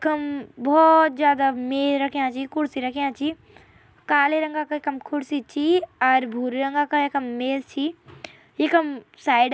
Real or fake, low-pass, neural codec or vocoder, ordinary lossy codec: real; none; none; none